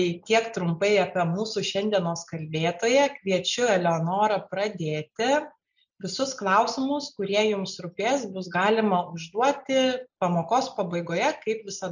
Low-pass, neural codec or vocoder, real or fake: 7.2 kHz; none; real